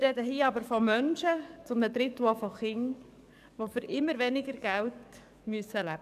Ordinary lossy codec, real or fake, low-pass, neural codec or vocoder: none; fake; 14.4 kHz; codec, 44.1 kHz, 7.8 kbps, DAC